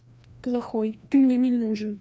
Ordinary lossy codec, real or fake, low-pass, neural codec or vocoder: none; fake; none; codec, 16 kHz, 1 kbps, FreqCodec, larger model